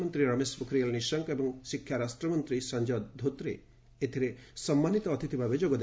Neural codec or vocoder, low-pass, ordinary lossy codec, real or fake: none; none; none; real